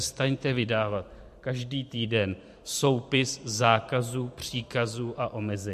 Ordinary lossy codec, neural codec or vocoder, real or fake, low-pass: MP3, 64 kbps; vocoder, 44.1 kHz, 128 mel bands every 512 samples, BigVGAN v2; fake; 14.4 kHz